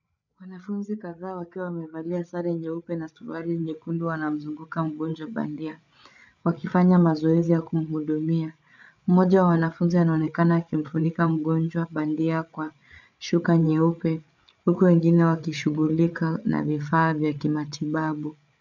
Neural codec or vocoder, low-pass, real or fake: codec, 16 kHz, 8 kbps, FreqCodec, larger model; 7.2 kHz; fake